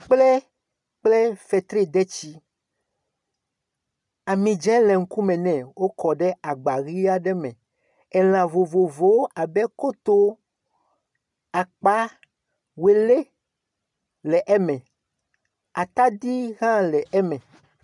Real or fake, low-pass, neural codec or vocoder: real; 10.8 kHz; none